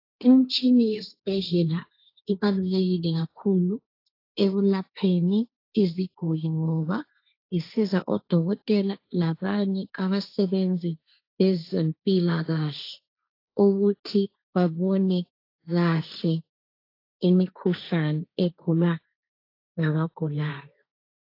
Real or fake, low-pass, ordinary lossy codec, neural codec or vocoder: fake; 5.4 kHz; AAC, 32 kbps; codec, 16 kHz, 1.1 kbps, Voila-Tokenizer